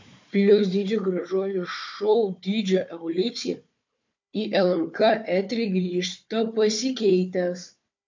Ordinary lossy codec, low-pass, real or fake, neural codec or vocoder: MP3, 48 kbps; 7.2 kHz; fake; codec, 16 kHz, 4 kbps, FunCodec, trained on Chinese and English, 50 frames a second